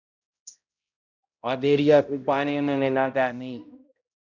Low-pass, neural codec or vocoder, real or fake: 7.2 kHz; codec, 16 kHz, 0.5 kbps, X-Codec, HuBERT features, trained on balanced general audio; fake